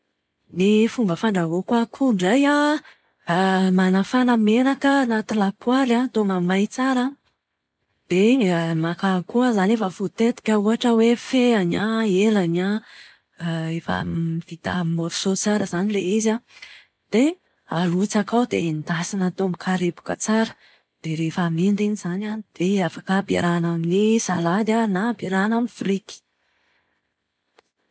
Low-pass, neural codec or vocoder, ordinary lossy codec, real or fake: none; none; none; real